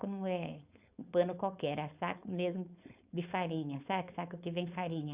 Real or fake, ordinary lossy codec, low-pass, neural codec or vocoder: fake; Opus, 64 kbps; 3.6 kHz; codec, 16 kHz, 4.8 kbps, FACodec